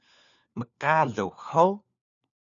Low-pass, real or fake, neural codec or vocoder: 7.2 kHz; fake; codec, 16 kHz, 4 kbps, FunCodec, trained on LibriTTS, 50 frames a second